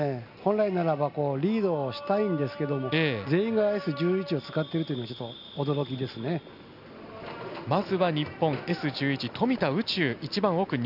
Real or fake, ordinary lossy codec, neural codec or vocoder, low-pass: real; none; none; 5.4 kHz